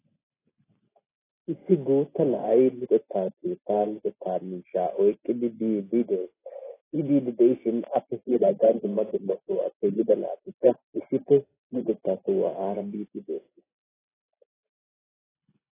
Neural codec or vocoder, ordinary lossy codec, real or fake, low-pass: none; AAC, 16 kbps; real; 3.6 kHz